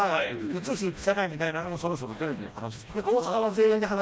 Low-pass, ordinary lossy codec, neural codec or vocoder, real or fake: none; none; codec, 16 kHz, 1 kbps, FreqCodec, smaller model; fake